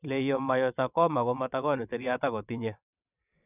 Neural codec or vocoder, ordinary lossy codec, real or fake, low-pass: vocoder, 22.05 kHz, 80 mel bands, WaveNeXt; none; fake; 3.6 kHz